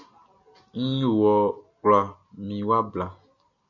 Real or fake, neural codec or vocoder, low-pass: real; none; 7.2 kHz